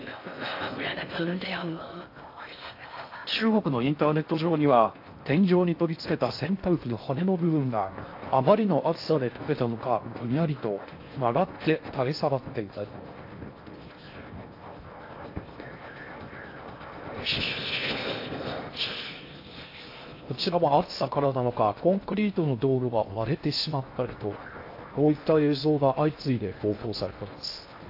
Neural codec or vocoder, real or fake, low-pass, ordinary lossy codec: codec, 16 kHz in and 24 kHz out, 0.6 kbps, FocalCodec, streaming, 4096 codes; fake; 5.4 kHz; AAC, 32 kbps